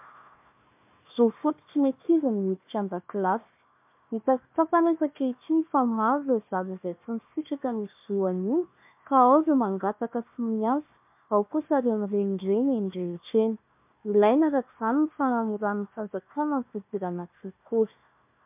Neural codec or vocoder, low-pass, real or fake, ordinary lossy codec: codec, 16 kHz, 1 kbps, FunCodec, trained on Chinese and English, 50 frames a second; 3.6 kHz; fake; MP3, 32 kbps